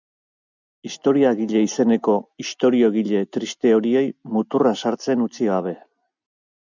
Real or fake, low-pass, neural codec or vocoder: real; 7.2 kHz; none